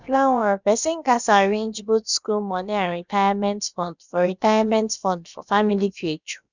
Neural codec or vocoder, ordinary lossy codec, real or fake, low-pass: codec, 16 kHz, about 1 kbps, DyCAST, with the encoder's durations; none; fake; 7.2 kHz